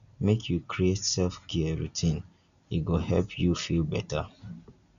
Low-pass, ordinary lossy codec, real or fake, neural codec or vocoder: 7.2 kHz; none; real; none